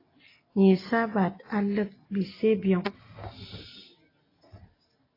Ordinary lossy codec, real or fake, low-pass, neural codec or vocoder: AAC, 24 kbps; real; 5.4 kHz; none